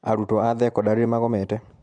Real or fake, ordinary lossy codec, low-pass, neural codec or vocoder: real; MP3, 96 kbps; 9.9 kHz; none